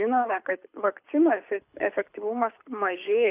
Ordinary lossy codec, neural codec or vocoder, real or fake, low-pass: AAC, 32 kbps; codec, 24 kHz, 6 kbps, HILCodec; fake; 3.6 kHz